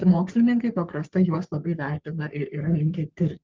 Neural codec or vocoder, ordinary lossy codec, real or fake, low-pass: codec, 44.1 kHz, 3.4 kbps, Pupu-Codec; Opus, 24 kbps; fake; 7.2 kHz